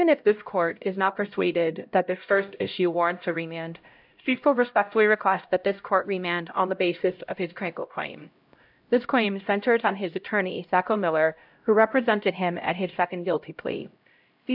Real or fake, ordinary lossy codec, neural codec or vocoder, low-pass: fake; AAC, 48 kbps; codec, 16 kHz, 0.5 kbps, X-Codec, HuBERT features, trained on LibriSpeech; 5.4 kHz